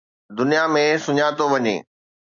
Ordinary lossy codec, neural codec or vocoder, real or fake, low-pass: MP3, 96 kbps; none; real; 7.2 kHz